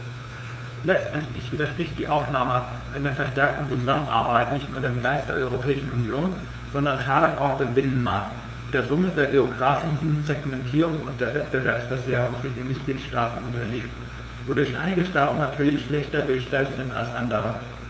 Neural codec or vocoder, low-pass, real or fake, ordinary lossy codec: codec, 16 kHz, 2 kbps, FunCodec, trained on LibriTTS, 25 frames a second; none; fake; none